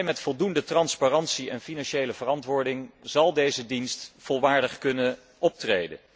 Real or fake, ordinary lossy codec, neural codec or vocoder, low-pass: real; none; none; none